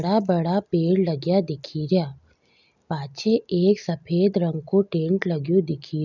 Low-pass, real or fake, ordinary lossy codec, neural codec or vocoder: 7.2 kHz; real; none; none